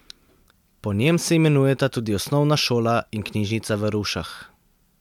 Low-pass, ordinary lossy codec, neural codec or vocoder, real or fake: 19.8 kHz; MP3, 96 kbps; none; real